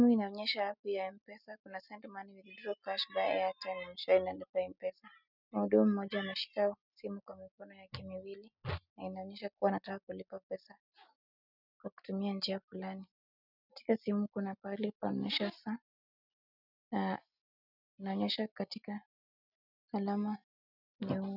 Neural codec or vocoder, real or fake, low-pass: none; real; 5.4 kHz